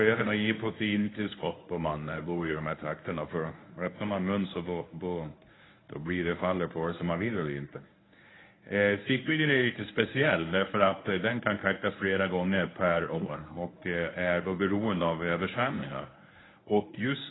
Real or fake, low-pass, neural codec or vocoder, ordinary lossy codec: fake; 7.2 kHz; codec, 24 kHz, 0.9 kbps, WavTokenizer, medium speech release version 1; AAC, 16 kbps